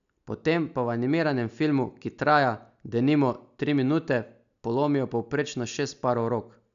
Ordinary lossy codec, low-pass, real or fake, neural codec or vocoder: none; 7.2 kHz; real; none